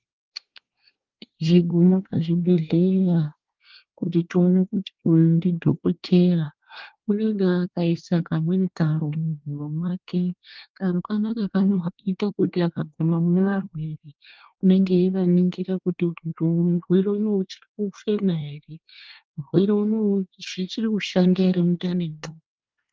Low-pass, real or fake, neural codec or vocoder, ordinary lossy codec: 7.2 kHz; fake; codec, 24 kHz, 1 kbps, SNAC; Opus, 32 kbps